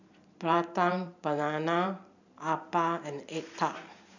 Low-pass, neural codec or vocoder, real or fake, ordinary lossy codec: 7.2 kHz; vocoder, 44.1 kHz, 128 mel bands every 512 samples, BigVGAN v2; fake; none